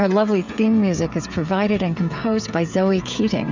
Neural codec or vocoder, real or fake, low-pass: codec, 16 kHz, 16 kbps, FreqCodec, smaller model; fake; 7.2 kHz